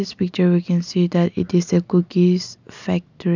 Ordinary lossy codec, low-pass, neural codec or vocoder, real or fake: none; 7.2 kHz; none; real